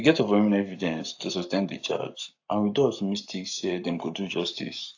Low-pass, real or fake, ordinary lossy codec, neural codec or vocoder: 7.2 kHz; fake; AAC, 48 kbps; codec, 44.1 kHz, 7.8 kbps, Pupu-Codec